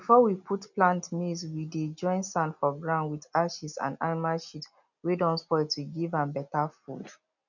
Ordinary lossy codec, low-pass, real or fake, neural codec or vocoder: none; 7.2 kHz; real; none